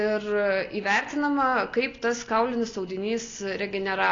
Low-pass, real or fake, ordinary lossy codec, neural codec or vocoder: 7.2 kHz; real; AAC, 32 kbps; none